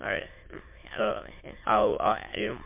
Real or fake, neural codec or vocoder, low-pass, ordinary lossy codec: fake; autoencoder, 22.05 kHz, a latent of 192 numbers a frame, VITS, trained on many speakers; 3.6 kHz; MP3, 24 kbps